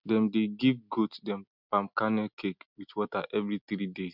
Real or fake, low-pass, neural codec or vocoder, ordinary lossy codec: real; 5.4 kHz; none; none